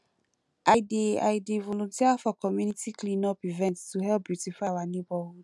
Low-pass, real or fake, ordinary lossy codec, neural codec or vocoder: none; real; none; none